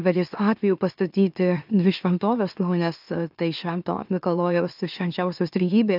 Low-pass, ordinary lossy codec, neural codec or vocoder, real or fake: 5.4 kHz; AAC, 48 kbps; autoencoder, 44.1 kHz, a latent of 192 numbers a frame, MeloTTS; fake